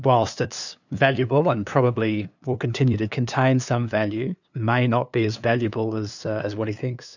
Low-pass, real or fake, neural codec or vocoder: 7.2 kHz; fake; codec, 16 kHz, 2 kbps, FunCodec, trained on LibriTTS, 25 frames a second